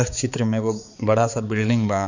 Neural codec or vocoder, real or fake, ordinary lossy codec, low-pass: codec, 16 kHz, 4 kbps, X-Codec, HuBERT features, trained on balanced general audio; fake; none; 7.2 kHz